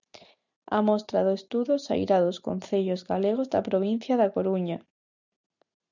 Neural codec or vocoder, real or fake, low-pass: none; real; 7.2 kHz